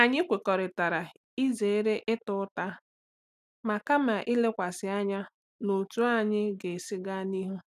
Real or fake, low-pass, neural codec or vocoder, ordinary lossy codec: real; 14.4 kHz; none; none